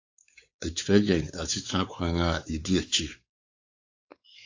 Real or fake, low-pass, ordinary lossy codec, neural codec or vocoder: fake; 7.2 kHz; AAC, 48 kbps; codec, 16 kHz, 4 kbps, X-Codec, WavLM features, trained on Multilingual LibriSpeech